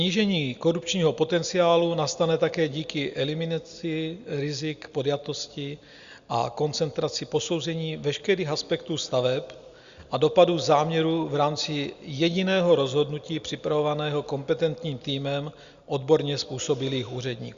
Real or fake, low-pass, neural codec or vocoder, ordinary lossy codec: real; 7.2 kHz; none; Opus, 64 kbps